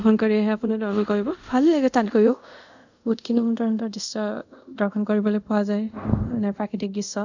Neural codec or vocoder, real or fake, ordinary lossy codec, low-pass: codec, 24 kHz, 0.5 kbps, DualCodec; fake; none; 7.2 kHz